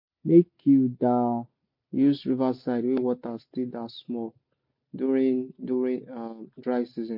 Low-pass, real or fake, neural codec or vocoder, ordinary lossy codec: 5.4 kHz; real; none; MP3, 32 kbps